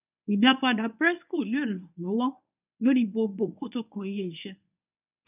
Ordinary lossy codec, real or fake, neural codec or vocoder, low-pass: none; fake; codec, 24 kHz, 0.9 kbps, WavTokenizer, medium speech release version 1; 3.6 kHz